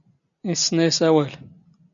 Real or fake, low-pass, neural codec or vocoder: real; 7.2 kHz; none